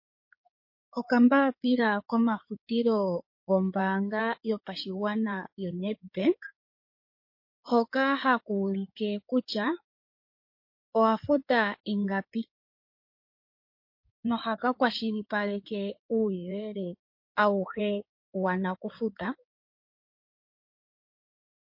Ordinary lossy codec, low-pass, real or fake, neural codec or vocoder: MP3, 32 kbps; 5.4 kHz; fake; codec, 16 kHz in and 24 kHz out, 2.2 kbps, FireRedTTS-2 codec